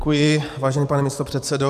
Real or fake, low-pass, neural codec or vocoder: fake; 14.4 kHz; vocoder, 44.1 kHz, 128 mel bands every 512 samples, BigVGAN v2